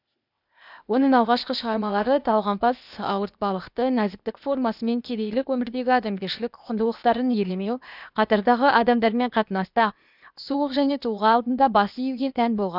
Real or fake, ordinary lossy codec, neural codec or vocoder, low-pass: fake; none; codec, 16 kHz, 0.8 kbps, ZipCodec; 5.4 kHz